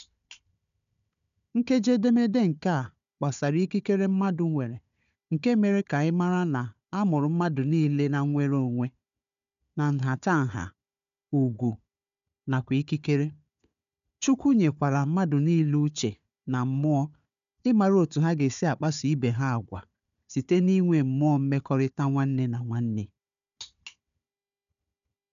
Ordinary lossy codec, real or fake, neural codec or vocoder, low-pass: none; fake; codec, 16 kHz, 4 kbps, FunCodec, trained on Chinese and English, 50 frames a second; 7.2 kHz